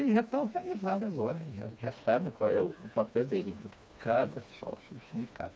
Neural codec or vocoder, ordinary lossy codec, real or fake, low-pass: codec, 16 kHz, 2 kbps, FreqCodec, smaller model; none; fake; none